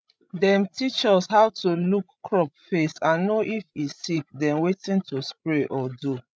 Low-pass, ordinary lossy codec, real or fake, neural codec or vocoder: none; none; fake; codec, 16 kHz, 16 kbps, FreqCodec, larger model